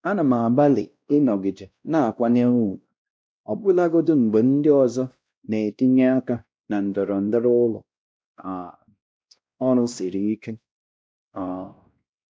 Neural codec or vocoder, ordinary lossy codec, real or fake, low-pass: codec, 16 kHz, 1 kbps, X-Codec, WavLM features, trained on Multilingual LibriSpeech; none; fake; none